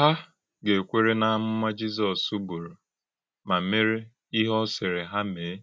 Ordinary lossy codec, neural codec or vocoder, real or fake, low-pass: none; none; real; none